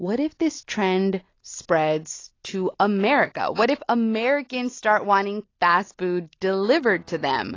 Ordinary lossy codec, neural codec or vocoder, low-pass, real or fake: AAC, 32 kbps; none; 7.2 kHz; real